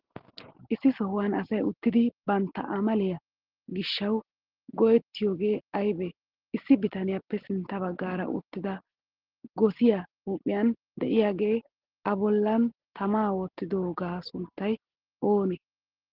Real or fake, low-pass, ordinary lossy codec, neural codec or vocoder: real; 5.4 kHz; Opus, 16 kbps; none